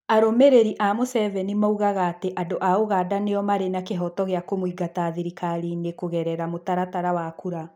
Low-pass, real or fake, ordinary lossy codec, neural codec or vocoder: 19.8 kHz; real; none; none